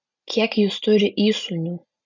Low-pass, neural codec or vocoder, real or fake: 7.2 kHz; none; real